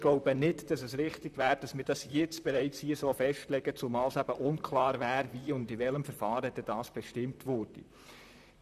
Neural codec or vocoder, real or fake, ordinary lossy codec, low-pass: vocoder, 44.1 kHz, 128 mel bands, Pupu-Vocoder; fake; none; 14.4 kHz